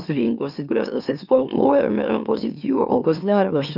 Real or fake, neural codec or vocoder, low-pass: fake; autoencoder, 44.1 kHz, a latent of 192 numbers a frame, MeloTTS; 5.4 kHz